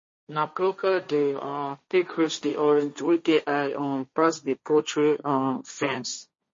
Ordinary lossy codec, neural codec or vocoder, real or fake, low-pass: MP3, 32 kbps; codec, 16 kHz, 1.1 kbps, Voila-Tokenizer; fake; 7.2 kHz